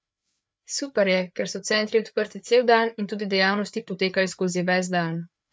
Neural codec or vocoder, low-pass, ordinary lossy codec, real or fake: codec, 16 kHz, 4 kbps, FreqCodec, larger model; none; none; fake